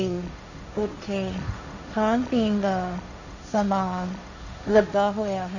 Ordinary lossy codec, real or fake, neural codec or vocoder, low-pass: none; fake; codec, 16 kHz, 1.1 kbps, Voila-Tokenizer; 7.2 kHz